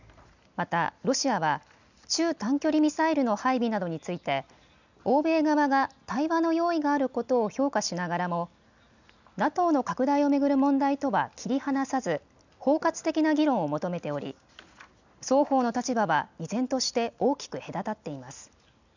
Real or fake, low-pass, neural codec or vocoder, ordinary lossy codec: real; 7.2 kHz; none; none